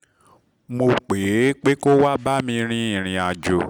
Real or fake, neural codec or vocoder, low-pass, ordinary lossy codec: real; none; 19.8 kHz; none